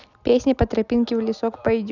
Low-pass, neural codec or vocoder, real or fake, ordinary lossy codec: 7.2 kHz; none; real; none